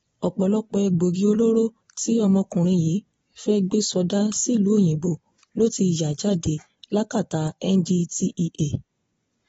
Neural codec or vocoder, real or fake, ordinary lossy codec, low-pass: vocoder, 24 kHz, 100 mel bands, Vocos; fake; AAC, 24 kbps; 10.8 kHz